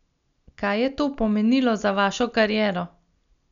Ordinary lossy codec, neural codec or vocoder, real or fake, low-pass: none; none; real; 7.2 kHz